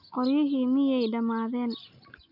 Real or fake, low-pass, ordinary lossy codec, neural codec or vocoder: real; 5.4 kHz; none; none